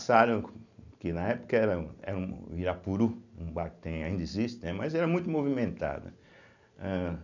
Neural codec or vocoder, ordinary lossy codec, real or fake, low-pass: none; none; real; 7.2 kHz